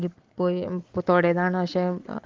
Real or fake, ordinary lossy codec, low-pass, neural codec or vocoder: real; Opus, 16 kbps; 7.2 kHz; none